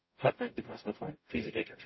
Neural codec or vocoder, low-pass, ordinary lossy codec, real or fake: codec, 44.1 kHz, 0.9 kbps, DAC; 7.2 kHz; MP3, 24 kbps; fake